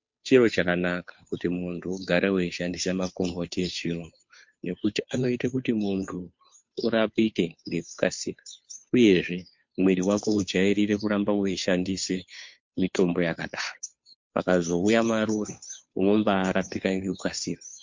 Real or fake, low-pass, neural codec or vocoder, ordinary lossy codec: fake; 7.2 kHz; codec, 16 kHz, 2 kbps, FunCodec, trained on Chinese and English, 25 frames a second; MP3, 48 kbps